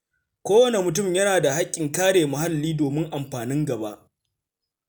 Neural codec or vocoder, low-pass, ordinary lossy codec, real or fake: none; none; none; real